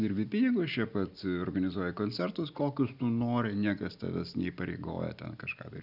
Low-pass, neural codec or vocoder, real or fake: 5.4 kHz; none; real